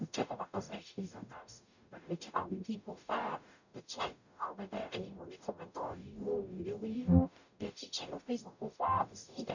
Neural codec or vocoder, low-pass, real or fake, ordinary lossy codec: codec, 44.1 kHz, 0.9 kbps, DAC; 7.2 kHz; fake; none